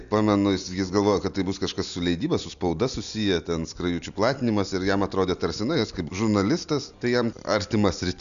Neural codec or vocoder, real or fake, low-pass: none; real; 7.2 kHz